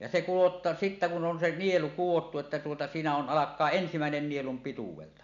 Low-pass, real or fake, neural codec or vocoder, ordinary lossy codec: 7.2 kHz; real; none; MP3, 96 kbps